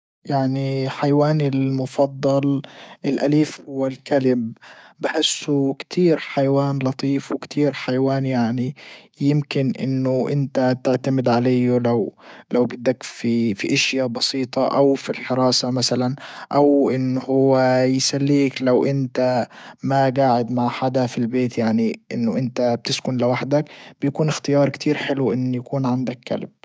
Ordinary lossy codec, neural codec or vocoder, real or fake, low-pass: none; codec, 16 kHz, 6 kbps, DAC; fake; none